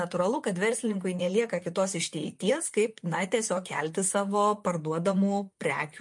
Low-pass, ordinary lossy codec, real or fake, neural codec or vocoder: 10.8 kHz; MP3, 48 kbps; fake; vocoder, 44.1 kHz, 128 mel bands, Pupu-Vocoder